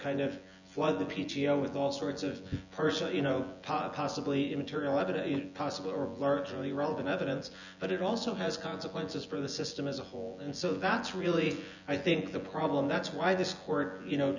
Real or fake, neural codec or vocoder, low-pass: fake; vocoder, 24 kHz, 100 mel bands, Vocos; 7.2 kHz